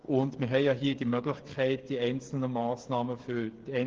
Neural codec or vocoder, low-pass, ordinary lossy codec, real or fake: codec, 16 kHz, 8 kbps, FreqCodec, smaller model; 7.2 kHz; Opus, 16 kbps; fake